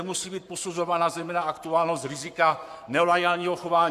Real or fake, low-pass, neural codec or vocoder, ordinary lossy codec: fake; 14.4 kHz; codec, 44.1 kHz, 7.8 kbps, Pupu-Codec; AAC, 96 kbps